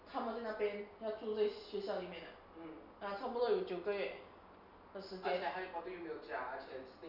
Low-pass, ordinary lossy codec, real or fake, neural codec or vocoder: 5.4 kHz; none; real; none